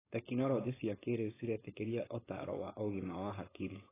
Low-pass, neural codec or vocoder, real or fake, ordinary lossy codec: 3.6 kHz; codec, 16 kHz, 4.8 kbps, FACodec; fake; AAC, 16 kbps